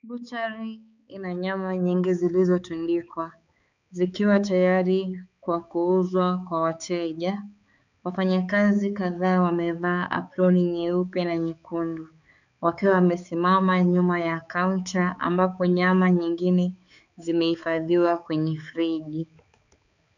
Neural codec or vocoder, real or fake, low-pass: codec, 16 kHz, 4 kbps, X-Codec, HuBERT features, trained on balanced general audio; fake; 7.2 kHz